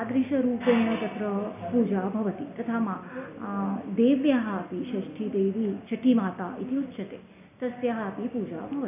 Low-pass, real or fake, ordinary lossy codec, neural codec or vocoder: 3.6 kHz; real; none; none